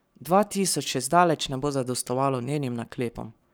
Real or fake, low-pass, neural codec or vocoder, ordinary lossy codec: fake; none; codec, 44.1 kHz, 7.8 kbps, Pupu-Codec; none